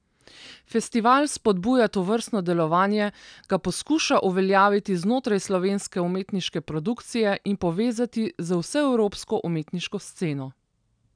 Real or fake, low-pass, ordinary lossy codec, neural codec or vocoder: real; 9.9 kHz; none; none